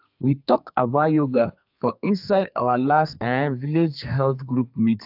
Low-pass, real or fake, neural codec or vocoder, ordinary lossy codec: 5.4 kHz; fake; codec, 32 kHz, 1.9 kbps, SNAC; AAC, 48 kbps